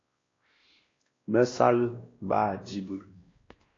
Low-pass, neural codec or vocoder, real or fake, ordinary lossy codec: 7.2 kHz; codec, 16 kHz, 1 kbps, X-Codec, WavLM features, trained on Multilingual LibriSpeech; fake; AAC, 32 kbps